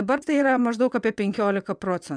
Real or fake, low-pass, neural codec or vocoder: fake; 9.9 kHz; vocoder, 22.05 kHz, 80 mel bands, WaveNeXt